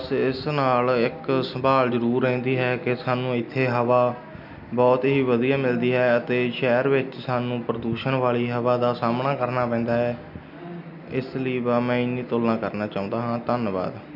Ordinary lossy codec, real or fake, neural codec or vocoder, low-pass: none; real; none; 5.4 kHz